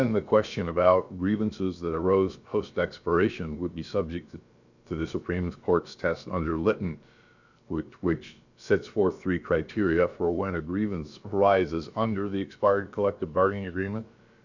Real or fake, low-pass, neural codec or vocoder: fake; 7.2 kHz; codec, 16 kHz, about 1 kbps, DyCAST, with the encoder's durations